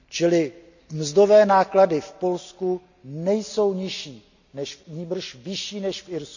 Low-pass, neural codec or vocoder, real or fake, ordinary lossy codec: 7.2 kHz; none; real; none